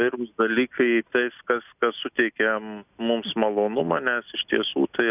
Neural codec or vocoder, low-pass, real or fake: none; 3.6 kHz; real